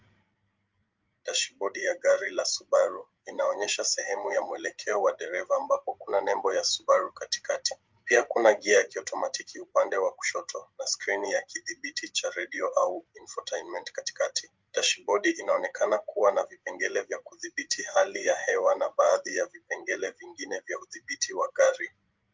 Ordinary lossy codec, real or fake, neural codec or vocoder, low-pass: Opus, 24 kbps; fake; codec, 16 kHz, 16 kbps, FreqCodec, larger model; 7.2 kHz